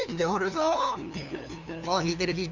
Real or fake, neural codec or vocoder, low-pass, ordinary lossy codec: fake; codec, 16 kHz, 2 kbps, FunCodec, trained on LibriTTS, 25 frames a second; 7.2 kHz; none